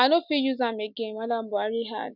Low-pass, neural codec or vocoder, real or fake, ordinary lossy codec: 5.4 kHz; none; real; none